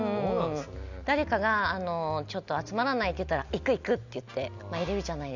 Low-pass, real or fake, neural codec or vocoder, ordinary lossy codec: 7.2 kHz; real; none; none